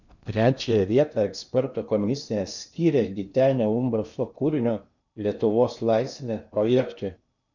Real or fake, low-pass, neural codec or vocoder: fake; 7.2 kHz; codec, 16 kHz in and 24 kHz out, 0.8 kbps, FocalCodec, streaming, 65536 codes